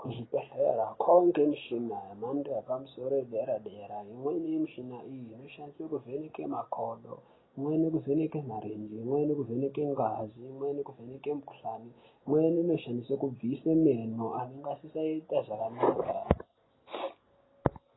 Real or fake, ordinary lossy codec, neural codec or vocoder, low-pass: real; AAC, 16 kbps; none; 7.2 kHz